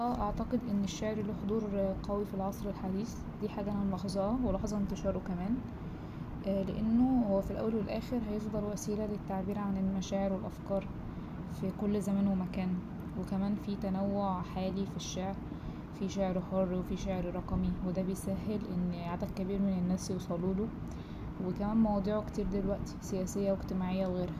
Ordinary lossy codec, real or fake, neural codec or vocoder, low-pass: none; real; none; 14.4 kHz